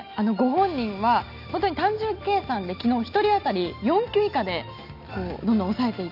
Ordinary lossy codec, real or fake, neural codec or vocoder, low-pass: none; real; none; 5.4 kHz